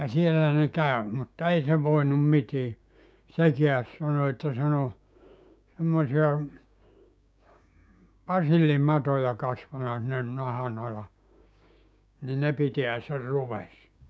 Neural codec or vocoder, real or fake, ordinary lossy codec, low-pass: codec, 16 kHz, 6 kbps, DAC; fake; none; none